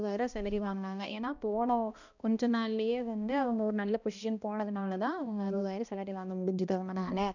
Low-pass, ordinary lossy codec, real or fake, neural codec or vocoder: 7.2 kHz; none; fake; codec, 16 kHz, 1 kbps, X-Codec, HuBERT features, trained on balanced general audio